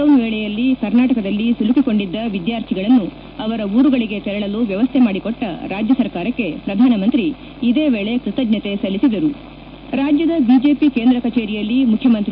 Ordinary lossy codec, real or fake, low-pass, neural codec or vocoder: none; real; 5.4 kHz; none